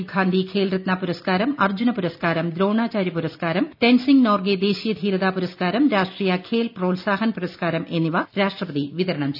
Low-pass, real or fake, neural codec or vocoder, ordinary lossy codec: 5.4 kHz; real; none; none